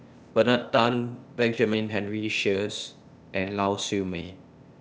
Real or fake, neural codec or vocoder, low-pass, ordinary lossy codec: fake; codec, 16 kHz, 0.8 kbps, ZipCodec; none; none